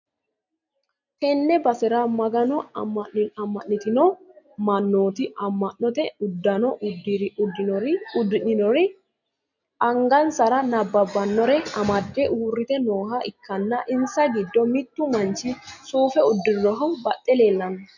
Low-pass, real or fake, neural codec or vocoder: 7.2 kHz; real; none